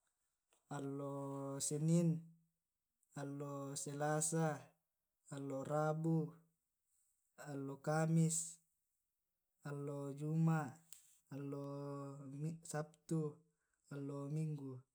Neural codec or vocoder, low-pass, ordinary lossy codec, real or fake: none; none; none; real